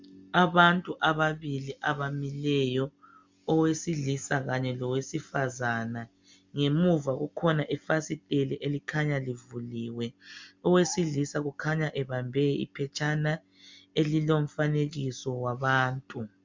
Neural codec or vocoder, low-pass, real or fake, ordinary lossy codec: none; 7.2 kHz; real; MP3, 64 kbps